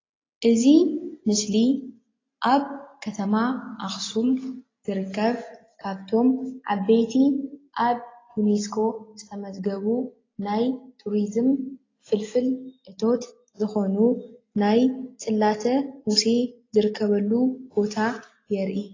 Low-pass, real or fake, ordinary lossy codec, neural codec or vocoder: 7.2 kHz; real; AAC, 32 kbps; none